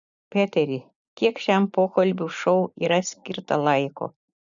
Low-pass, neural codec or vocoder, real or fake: 7.2 kHz; none; real